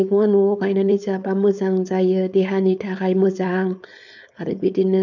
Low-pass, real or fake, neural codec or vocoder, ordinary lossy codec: 7.2 kHz; fake; codec, 16 kHz, 4.8 kbps, FACodec; none